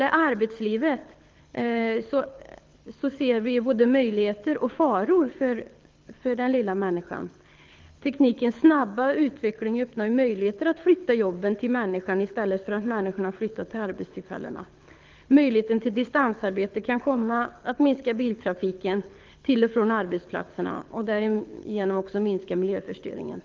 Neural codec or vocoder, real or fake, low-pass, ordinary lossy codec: codec, 24 kHz, 6 kbps, HILCodec; fake; 7.2 kHz; Opus, 32 kbps